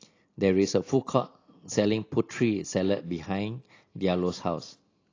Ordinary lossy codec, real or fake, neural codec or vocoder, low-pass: AAC, 32 kbps; real; none; 7.2 kHz